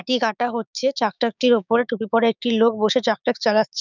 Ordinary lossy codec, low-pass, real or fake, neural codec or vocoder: none; 7.2 kHz; fake; codec, 16 kHz, 6 kbps, DAC